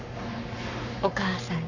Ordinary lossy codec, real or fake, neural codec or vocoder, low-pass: none; fake; codec, 44.1 kHz, 7.8 kbps, Pupu-Codec; 7.2 kHz